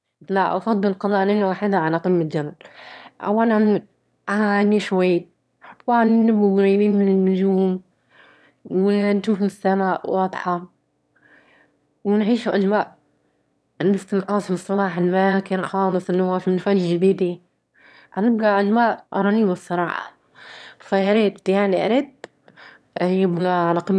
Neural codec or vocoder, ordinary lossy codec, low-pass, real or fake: autoencoder, 22.05 kHz, a latent of 192 numbers a frame, VITS, trained on one speaker; none; none; fake